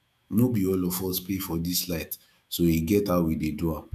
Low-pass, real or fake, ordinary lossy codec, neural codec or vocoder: 14.4 kHz; fake; none; autoencoder, 48 kHz, 128 numbers a frame, DAC-VAE, trained on Japanese speech